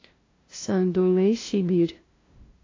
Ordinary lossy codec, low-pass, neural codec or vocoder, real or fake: AAC, 32 kbps; 7.2 kHz; codec, 16 kHz, 0.5 kbps, FunCodec, trained on LibriTTS, 25 frames a second; fake